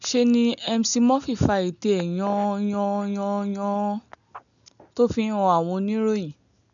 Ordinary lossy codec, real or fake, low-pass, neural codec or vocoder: none; real; 7.2 kHz; none